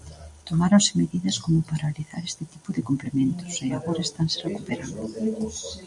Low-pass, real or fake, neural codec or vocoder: 10.8 kHz; real; none